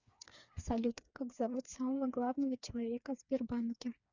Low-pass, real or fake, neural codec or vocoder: 7.2 kHz; fake; codec, 16 kHz, 4 kbps, FreqCodec, smaller model